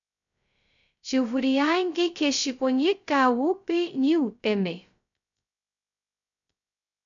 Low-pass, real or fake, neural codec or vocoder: 7.2 kHz; fake; codec, 16 kHz, 0.2 kbps, FocalCodec